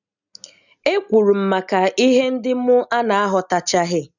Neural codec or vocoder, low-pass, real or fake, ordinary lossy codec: none; 7.2 kHz; real; none